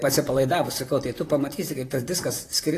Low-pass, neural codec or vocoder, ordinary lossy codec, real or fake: 14.4 kHz; vocoder, 44.1 kHz, 128 mel bands, Pupu-Vocoder; AAC, 48 kbps; fake